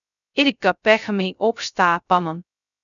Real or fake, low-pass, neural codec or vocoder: fake; 7.2 kHz; codec, 16 kHz, 0.2 kbps, FocalCodec